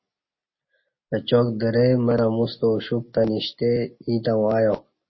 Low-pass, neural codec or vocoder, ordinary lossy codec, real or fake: 7.2 kHz; none; MP3, 24 kbps; real